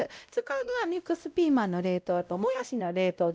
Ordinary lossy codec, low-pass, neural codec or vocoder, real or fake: none; none; codec, 16 kHz, 0.5 kbps, X-Codec, WavLM features, trained on Multilingual LibriSpeech; fake